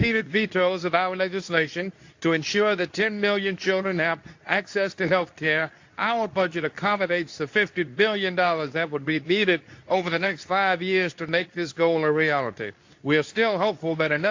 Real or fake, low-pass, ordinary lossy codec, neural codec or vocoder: fake; 7.2 kHz; AAC, 48 kbps; codec, 24 kHz, 0.9 kbps, WavTokenizer, medium speech release version 2